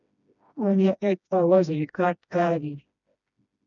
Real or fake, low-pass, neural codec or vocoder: fake; 7.2 kHz; codec, 16 kHz, 1 kbps, FreqCodec, smaller model